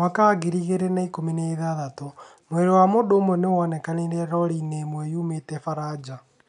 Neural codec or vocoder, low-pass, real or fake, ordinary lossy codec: none; 10.8 kHz; real; none